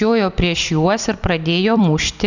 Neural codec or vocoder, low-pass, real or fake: vocoder, 44.1 kHz, 128 mel bands every 256 samples, BigVGAN v2; 7.2 kHz; fake